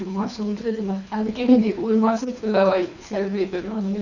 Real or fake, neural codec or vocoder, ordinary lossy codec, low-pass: fake; codec, 24 kHz, 3 kbps, HILCodec; none; 7.2 kHz